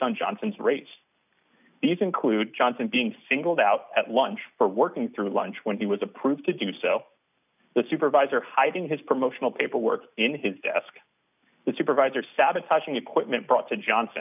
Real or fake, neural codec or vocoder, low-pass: real; none; 3.6 kHz